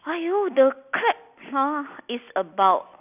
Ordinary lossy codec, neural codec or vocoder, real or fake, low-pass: none; none; real; 3.6 kHz